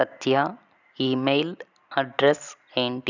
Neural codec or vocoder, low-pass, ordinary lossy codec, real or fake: none; 7.2 kHz; none; real